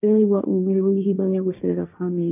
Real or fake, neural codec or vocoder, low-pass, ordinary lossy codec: fake; codec, 16 kHz, 1.1 kbps, Voila-Tokenizer; 3.6 kHz; none